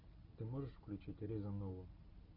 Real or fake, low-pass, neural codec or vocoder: real; 5.4 kHz; none